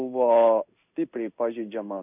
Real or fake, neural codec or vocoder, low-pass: fake; codec, 16 kHz in and 24 kHz out, 1 kbps, XY-Tokenizer; 3.6 kHz